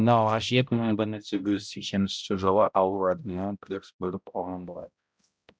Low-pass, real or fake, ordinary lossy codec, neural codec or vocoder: none; fake; none; codec, 16 kHz, 0.5 kbps, X-Codec, HuBERT features, trained on balanced general audio